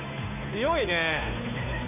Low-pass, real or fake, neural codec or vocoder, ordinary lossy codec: 3.6 kHz; fake; codec, 16 kHz, 2 kbps, FunCodec, trained on Chinese and English, 25 frames a second; MP3, 32 kbps